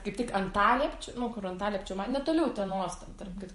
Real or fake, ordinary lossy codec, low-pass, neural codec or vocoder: fake; MP3, 48 kbps; 14.4 kHz; vocoder, 44.1 kHz, 128 mel bands every 512 samples, BigVGAN v2